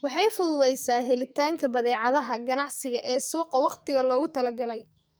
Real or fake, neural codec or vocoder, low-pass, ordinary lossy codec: fake; codec, 44.1 kHz, 2.6 kbps, SNAC; none; none